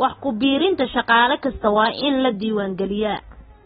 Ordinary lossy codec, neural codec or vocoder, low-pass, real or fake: AAC, 16 kbps; none; 9.9 kHz; real